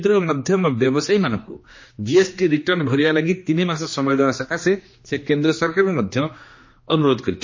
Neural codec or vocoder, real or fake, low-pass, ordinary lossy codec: codec, 16 kHz, 2 kbps, X-Codec, HuBERT features, trained on general audio; fake; 7.2 kHz; MP3, 32 kbps